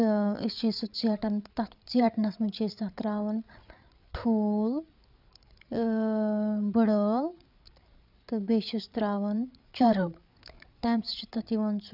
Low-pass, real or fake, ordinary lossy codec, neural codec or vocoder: 5.4 kHz; fake; none; codec, 16 kHz, 8 kbps, FreqCodec, larger model